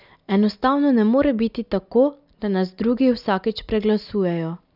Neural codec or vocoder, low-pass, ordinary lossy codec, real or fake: none; 5.4 kHz; none; real